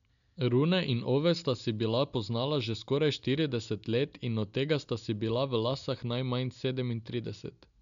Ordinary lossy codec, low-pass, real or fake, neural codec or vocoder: none; 7.2 kHz; real; none